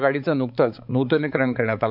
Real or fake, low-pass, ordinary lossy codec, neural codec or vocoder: fake; 5.4 kHz; none; codec, 16 kHz, 4 kbps, X-Codec, HuBERT features, trained on balanced general audio